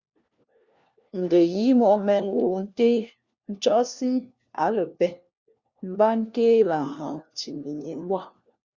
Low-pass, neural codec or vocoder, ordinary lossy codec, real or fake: 7.2 kHz; codec, 16 kHz, 1 kbps, FunCodec, trained on LibriTTS, 50 frames a second; Opus, 64 kbps; fake